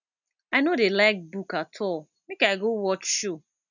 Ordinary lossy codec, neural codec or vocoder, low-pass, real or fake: none; none; 7.2 kHz; real